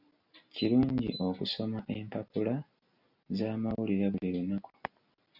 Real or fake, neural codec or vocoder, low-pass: real; none; 5.4 kHz